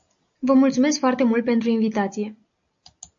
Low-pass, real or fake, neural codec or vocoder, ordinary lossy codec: 7.2 kHz; real; none; AAC, 64 kbps